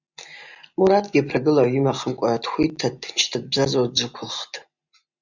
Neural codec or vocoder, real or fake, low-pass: none; real; 7.2 kHz